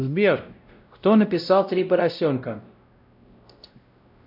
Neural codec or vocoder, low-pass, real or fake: codec, 16 kHz, 0.5 kbps, X-Codec, WavLM features, trained on Multilingual LibriSpeech; 5.4 kHz; fake